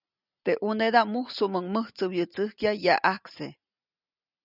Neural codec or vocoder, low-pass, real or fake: none; 5.4 kHz; real